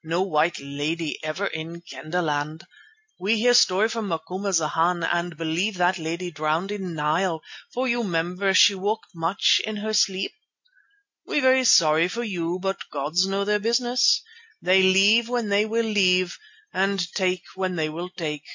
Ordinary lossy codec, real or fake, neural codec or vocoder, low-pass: MP3, 48 kbps; real; none; 7.2 kHz